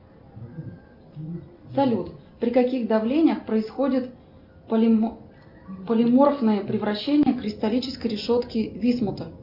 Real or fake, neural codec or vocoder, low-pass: real; none; 5.4 kHz